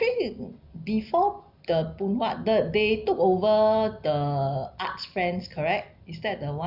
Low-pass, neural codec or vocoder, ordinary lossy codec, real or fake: 5.4 kHz; none; none; real